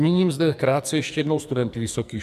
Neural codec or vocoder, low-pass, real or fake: codec, 44.1 kHz, 2.6 kbps, SNAC; 14.4 kHz; fake